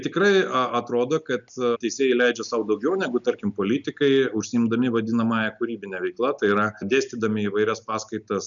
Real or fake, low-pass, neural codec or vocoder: real; 7.2 kHz; none